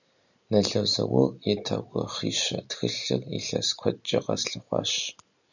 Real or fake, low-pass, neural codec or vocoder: real; 7.2 kHz; none